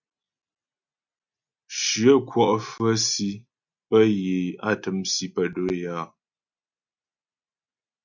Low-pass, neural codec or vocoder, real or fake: 7.2 kHz; none; real